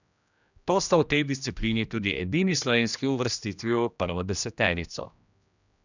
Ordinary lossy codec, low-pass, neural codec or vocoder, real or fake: none; 7.2 kHz; codec, 16 kHz, 1 kbps, X-Codec, HuBERT features, trained on general audio; fake